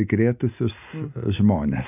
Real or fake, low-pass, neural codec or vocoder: real; 3.6 kHz; none